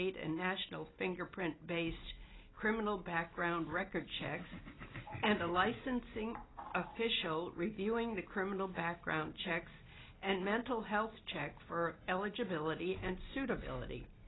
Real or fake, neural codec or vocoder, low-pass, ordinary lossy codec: real; none; 7.2 kHz; AAC, 16 kbps